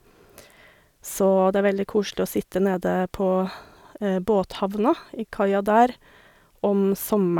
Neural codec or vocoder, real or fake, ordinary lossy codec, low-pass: none; real; none; 19.8 kHz